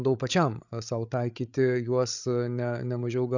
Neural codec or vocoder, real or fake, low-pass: codec, 16 kHz, 8 kbps, FreqCodec, larger model; fake; 7.2 kHz